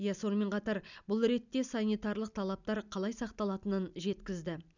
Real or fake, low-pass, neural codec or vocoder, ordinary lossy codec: real; 7.2 kHz; none; none